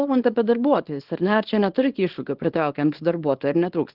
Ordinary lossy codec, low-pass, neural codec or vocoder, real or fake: Opus, 16 kbps; 5.4 kHz; codec, 24 kHz, 0.9 kbps, WavTokenizer, small release; fake